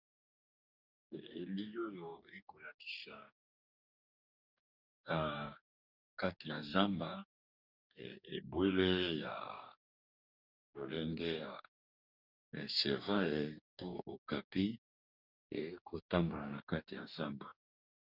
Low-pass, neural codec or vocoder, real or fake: 5.4 kHz; codec, 44.1 kHz, 2.6 kbps, DAC; fake